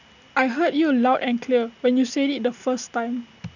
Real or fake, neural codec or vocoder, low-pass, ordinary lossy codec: real; none; 7.2 kHz; none